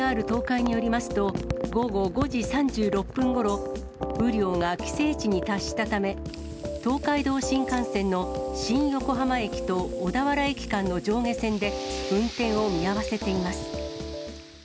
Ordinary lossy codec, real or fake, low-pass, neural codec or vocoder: none; real; none; none